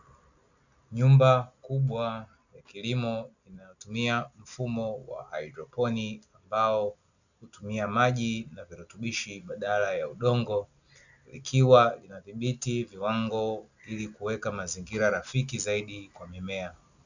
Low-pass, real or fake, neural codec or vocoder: 7.2 kHz; real; none